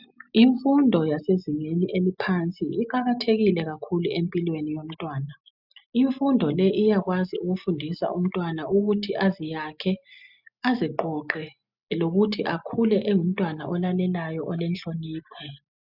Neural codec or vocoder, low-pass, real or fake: none; 5.4 kHz; real